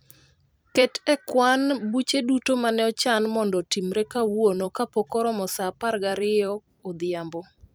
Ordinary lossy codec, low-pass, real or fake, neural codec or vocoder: none; none; fake; vocoder, 44.1 kHz, 128 mel bands every 256 samples, BigVGAN v2